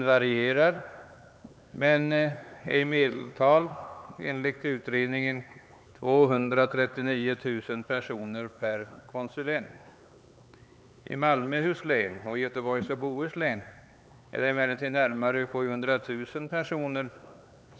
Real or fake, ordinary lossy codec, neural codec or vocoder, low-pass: fake; none; codec, 16 kHz, 4 kbps, X-Codec, HuBERT features, trained on LibriSpeech; none